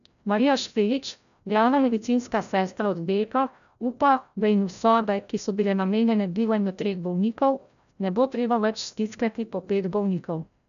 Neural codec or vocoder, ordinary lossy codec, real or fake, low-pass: codec, 16 kHz, 0.5 kbps, FreqCodec, larger model; none; fake; 7.2 kHz